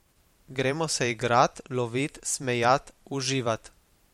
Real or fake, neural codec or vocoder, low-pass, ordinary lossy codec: fake; vocoder, 44.1 kHz, 128 mel bands every 512 samples, BigVGAN v2; 19.8 kHz; MP3, 64 kbps